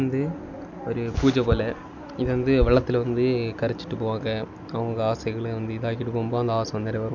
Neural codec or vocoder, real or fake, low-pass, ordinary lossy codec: none; real; 7.2 kHz; none